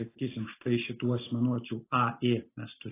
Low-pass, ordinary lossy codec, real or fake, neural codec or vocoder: 3.6 kHz; AAC, 16 kbps; real; none